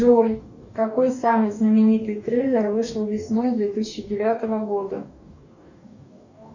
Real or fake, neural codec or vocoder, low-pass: fake; codec, 44.1 kHz, 2.6 kbps, DAC; 7.2 kHz